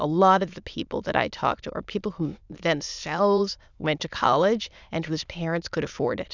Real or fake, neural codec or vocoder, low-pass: fake; autoencoder, 22.05 kHz, a latent of 192 numbers a frame, VITS, trained on many speakers; 7.2 kHz